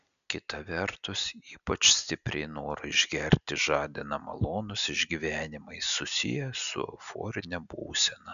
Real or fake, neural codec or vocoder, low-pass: real; none; 7.2 kHz